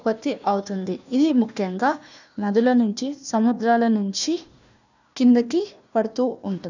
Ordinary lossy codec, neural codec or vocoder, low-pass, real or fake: none; codec, 16 kHz, 1 kbps, FunCodec, trained on Chinese and English, 50 frames a second; 7.2 kHz; fake